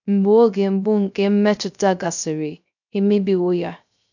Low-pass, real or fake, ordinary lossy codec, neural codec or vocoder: 7.2 kHz; fake; none; codec, 16 kHz, 0.3 kbps, FocalCodec